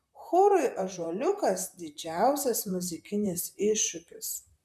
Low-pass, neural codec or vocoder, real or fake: 14.4 kHz; vocoder, 44.1 kHz, 128 mel bands, Pupu-Vocoder; fake